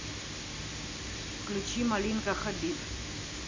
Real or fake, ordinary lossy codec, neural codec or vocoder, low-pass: real; MP3, 64 kbps; none; 7.2 kHz